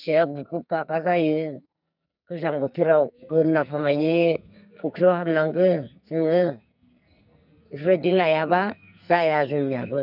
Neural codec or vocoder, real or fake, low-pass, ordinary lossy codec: codec, 44.1 kHz, 2.6 kbps, SNAC; fake; 5.4 kHz; none